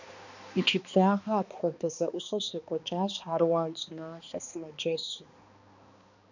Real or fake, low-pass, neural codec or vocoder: fake; 7.2 kHz; codec, 16 kHz, 2 kbps, X-Codec, HuBERT features, trained on balanced general audio